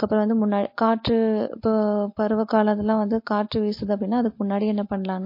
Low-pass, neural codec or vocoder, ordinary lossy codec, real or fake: 5.4 kHz; none; MP3, 32 kbps; real